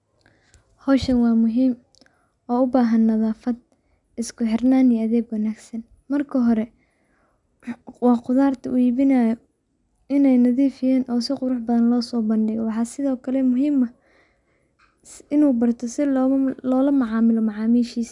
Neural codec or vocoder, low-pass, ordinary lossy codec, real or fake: none; 10.8 kHz; none; real